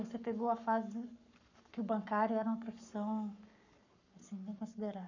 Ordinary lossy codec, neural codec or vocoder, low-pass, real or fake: none; codec, 44.1 kHz, 7.8 kbps, Pupu-Codec; 7.2 kHz; fake